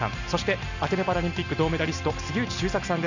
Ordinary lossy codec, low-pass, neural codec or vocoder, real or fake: none; 7.2 kHz; none; real